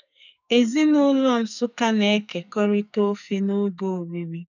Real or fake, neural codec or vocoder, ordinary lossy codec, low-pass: fake; codec, 44.1 kHz, 2.6 kbps, SNAC; none; 7.2 kHz